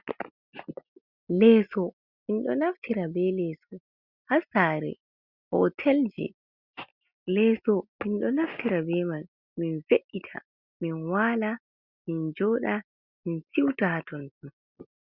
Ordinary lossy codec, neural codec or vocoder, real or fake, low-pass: Opus, 64 kbps; none; real; 5.4 kHz